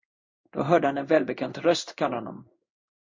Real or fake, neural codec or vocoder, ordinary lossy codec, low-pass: fake; codec, 16 kHz in and 24 kHz out, 1 kbps, XY-Tokenizer; MP3, 48 kbps; 7.2 kHz